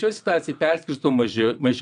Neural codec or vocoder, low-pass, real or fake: vocoder, 22.05 kHz, 80 mel bands, WaveNeXt; 9.9 kHz; fake